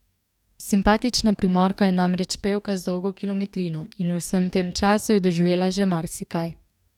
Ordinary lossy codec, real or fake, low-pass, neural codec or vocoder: none; fake; 19.8 kHz; codec, 44.1 kHz, 2.6 kbps, DAC